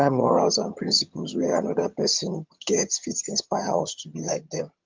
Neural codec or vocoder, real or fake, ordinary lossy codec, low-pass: vocoder, 22.05 kHz, 80 mel bands, HiFi-GAN; fake; Opus, 32 kbps; 7.2 kHz